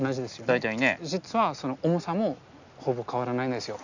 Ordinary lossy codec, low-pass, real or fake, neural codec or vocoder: none; 7.2 kHz; real; none